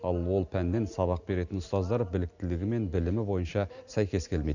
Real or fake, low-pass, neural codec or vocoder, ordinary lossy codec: real; 7.2 kHz; none; none